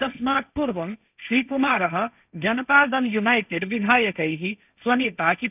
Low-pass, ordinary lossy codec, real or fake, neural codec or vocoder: 3.6 kHz; none; fake; codec, 16 kHz, 1.1 kbps, Voila-Tokenizer